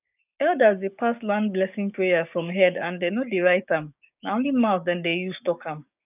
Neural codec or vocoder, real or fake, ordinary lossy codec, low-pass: codec, 16 kHz, 6 kbps, DAC; fake; none; 3.6 kHz